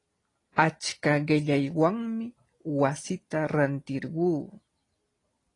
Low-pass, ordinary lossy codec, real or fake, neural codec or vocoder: 10.8 kHz; AAC, 32 kbps; real; none